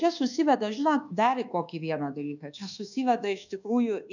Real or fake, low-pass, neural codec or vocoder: fake; 7.2 kHz; codec, 24 kHz, 1.2 kbps, DualCodec